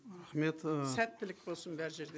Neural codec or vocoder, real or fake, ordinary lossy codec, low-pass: none; real; none; none